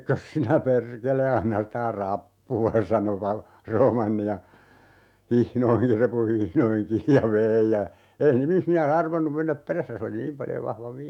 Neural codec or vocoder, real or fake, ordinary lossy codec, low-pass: autoencoder, 48 kHz, 128 numbers a frame, DAC-VAE, trained on Japanese speech; fake; none; 19.8 kHz